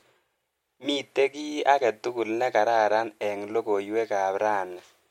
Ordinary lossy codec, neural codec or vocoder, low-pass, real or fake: MP3, 64 kbps; none; 19.8 kHz; real